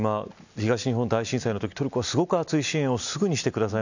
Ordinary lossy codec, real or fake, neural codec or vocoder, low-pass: none; real; none; 7.2 kHz